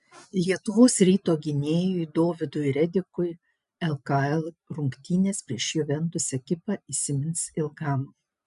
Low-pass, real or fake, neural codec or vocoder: 10.8 kHz; real; none